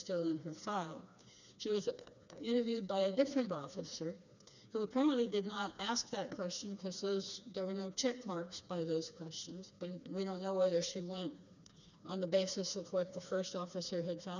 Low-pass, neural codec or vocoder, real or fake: 7.2 kHz; codec, 16 kHz, 2 kbps, FreqCodec, smaller model; fake